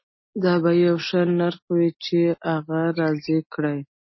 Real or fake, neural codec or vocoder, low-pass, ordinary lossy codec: real; none; 7.2 kHz; MP3, 24 kbps